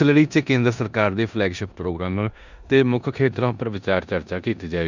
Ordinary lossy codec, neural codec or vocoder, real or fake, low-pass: none; codec, 16 kHz in and 24 kHz out, 0.9 kbps, LongCat-Audio-Codec, four codebook decoder; fake; 7.2 kHz